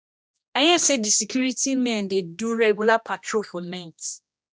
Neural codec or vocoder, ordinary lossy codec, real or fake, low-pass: codec, 16 kHz, 1 kbps, X-Codec, HuBERT features, trained on general audio; none; fake; none